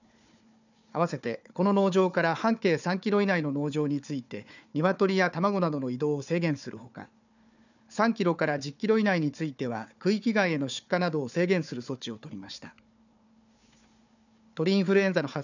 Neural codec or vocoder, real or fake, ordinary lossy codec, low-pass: codec, 16 kHz, 4 kbps, FunCodec, trained on Chinese and English, 50 frames a second; fake; none; 7.2 kHz